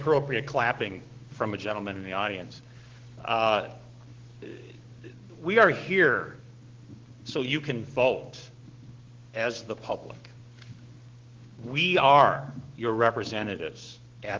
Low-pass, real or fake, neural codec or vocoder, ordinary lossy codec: 7.2 kHz; fake; codec, 16 kHz, 6 kbps, DAC; Opus, 16 kbps